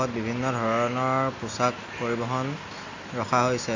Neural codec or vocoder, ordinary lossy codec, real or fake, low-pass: none; MP3, 48 kbps; real; 7.2 kHz